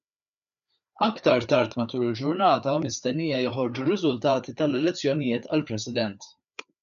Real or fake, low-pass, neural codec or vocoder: fake; 7.2 kHz; codec, 16 kHz, 4 kbps, FreqCodec, larger model